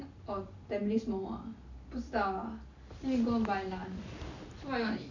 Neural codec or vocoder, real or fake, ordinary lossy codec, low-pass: none; real; none; 7.2 kHz